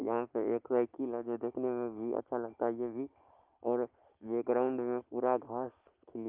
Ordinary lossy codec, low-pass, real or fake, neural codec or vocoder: Opus, 32 kbps; 3.6 kHz; real; none